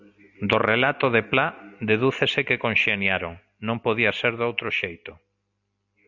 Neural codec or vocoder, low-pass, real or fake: none; 7.2 kHz; real